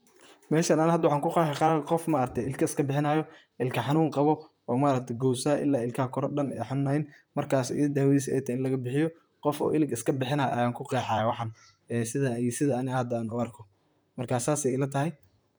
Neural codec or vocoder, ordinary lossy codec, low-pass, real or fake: vocoder, 44.1 kHz, 128 mel bands, Pupu-Vocoder; none; none; fake